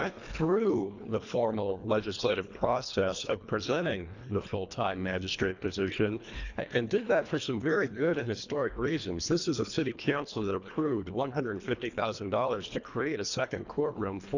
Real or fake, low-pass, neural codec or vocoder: fake; 7.2 kHz; codec, 24 kHz, 1.5 kbps, HILCodec